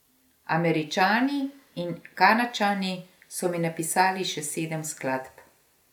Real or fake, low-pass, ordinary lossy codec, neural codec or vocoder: real; 19.8 kHz; none; none